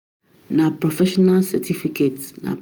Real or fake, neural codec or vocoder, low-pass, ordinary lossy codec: real; none; none; none